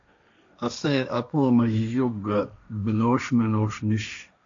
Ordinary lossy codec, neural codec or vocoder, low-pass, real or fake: MP3, 64 kbps; codec, 16 kHz, 1.1 kbps, Voila-Tokenizer; 7.2 kHz; fake